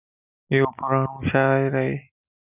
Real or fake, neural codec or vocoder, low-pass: real; none; 3.6 kHz